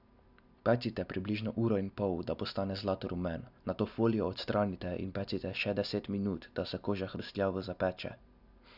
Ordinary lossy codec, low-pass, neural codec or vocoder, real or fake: none; 5.4 kHz; none; real